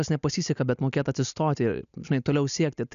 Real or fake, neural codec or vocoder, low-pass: real; none; 7.2 kHz